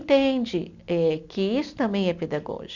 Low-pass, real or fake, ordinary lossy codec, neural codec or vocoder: 7.2 kHz; real; none; none